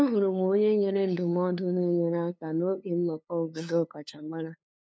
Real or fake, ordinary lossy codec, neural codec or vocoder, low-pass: fake; none; codec, 16 kHz, 2 kbps, FunCodec, trained on LibriTTS, 25 frames a second; none